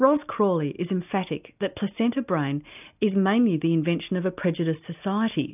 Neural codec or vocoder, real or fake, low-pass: none; real; 3.6 kHz